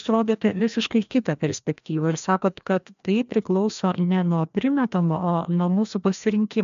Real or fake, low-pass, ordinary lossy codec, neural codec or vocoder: fake; 7.2 kHz; MP3, 64 kbps; codec, 16 kHz, 1 kbps, FreqCodec, larger model